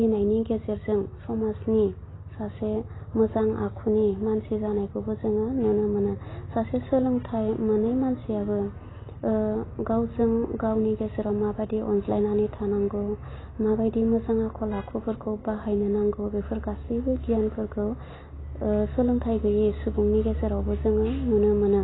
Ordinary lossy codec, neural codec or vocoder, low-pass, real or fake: AAC, 16 kbps; none; 7.2 kHz; real